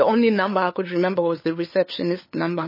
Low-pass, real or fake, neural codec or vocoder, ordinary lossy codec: 5.4 kHz; fake; codec, 16 kHz, 8 kbps, FunCodec, trained on LibriTTS, 25 frames a second; MP3, 24 kbps